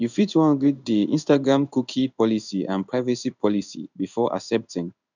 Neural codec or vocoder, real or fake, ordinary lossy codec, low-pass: codec, 16 kHz in and 24 kHz out, 1 kbps, XY-Tokenizer; fake; none; 7.2 kHz